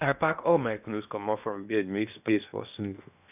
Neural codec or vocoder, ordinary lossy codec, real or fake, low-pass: codec, 16 kHz in and 24 kHz out, 0.6 kbps, FocalCodec, streaming, 2048 codes; none; fake; 3.6 kHz